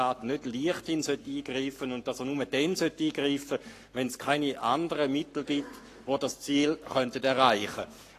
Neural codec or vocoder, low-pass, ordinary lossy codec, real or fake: codec, 44.1 kHz, 7.8 kbps, Pupu-Codec; 14.4 kHz; AAC, 48 kbps; fake